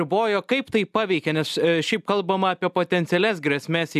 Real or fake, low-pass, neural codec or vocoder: real; 14.4 kHz; none